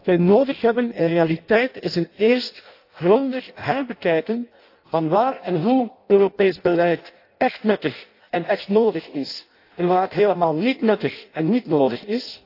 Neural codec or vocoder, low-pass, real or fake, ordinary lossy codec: codec, 16 kHz in and 24 kHz out, 0.6 kbps, FireRedTTS-2 codec; 5.4 kHz; fake; AAC, 32 kbps